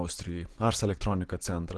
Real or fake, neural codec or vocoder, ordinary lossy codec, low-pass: real; none; Opus, 16 kbps; 10.8 kHz